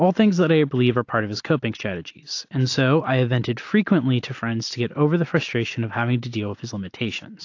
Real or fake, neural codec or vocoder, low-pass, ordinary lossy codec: real; none; 7.2 kHz; AAC, 48 kbps